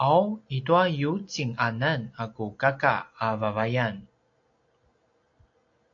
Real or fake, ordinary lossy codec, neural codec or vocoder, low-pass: real; AAC, 48 kbps; none; 7.2 kHz